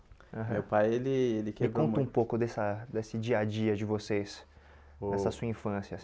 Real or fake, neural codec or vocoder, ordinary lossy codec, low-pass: real; none; none; none